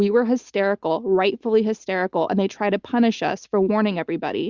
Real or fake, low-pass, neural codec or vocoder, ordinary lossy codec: real; 7.2 kHz; none; Opus, 64 kbps